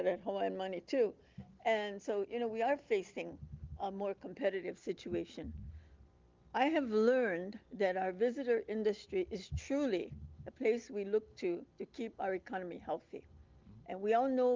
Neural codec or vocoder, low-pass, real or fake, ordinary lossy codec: none; 7.2 kHz; real; Opus, 32 kbps